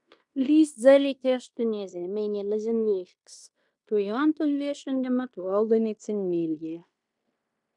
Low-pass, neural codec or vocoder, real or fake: 10.8 kHz; codec, 16 kHz in and 24 kHz out, 0.9 kbps, LongCat-Audio-Codec, fine tuned four codebook decoder; fake